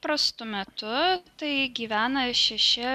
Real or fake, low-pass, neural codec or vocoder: real; 14.4 kHz; none